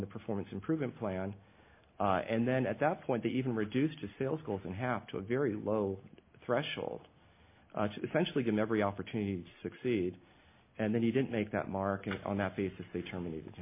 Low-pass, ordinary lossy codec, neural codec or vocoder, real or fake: 3.6 kHz; MP3, 24 kbps; none; real